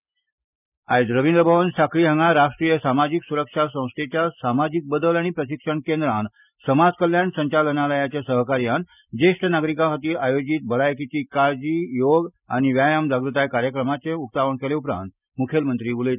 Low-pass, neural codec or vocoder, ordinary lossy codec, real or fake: 3.6 kHz; none; none; real